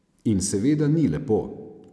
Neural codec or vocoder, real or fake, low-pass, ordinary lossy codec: none; real; none; none